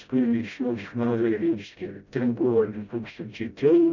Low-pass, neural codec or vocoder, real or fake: 7.2 kHz; codec, 16 kHz, 0.5 kbps, FreqCodec, smaller model; fake